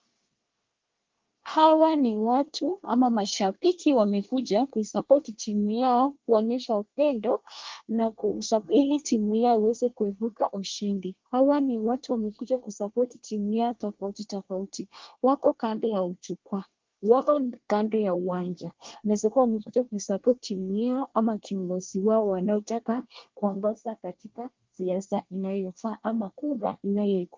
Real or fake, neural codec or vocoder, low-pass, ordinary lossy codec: fake; codec, 24 kHz, 1 kbps, SNAC; 7.2 kHz; Opus, 32 kbps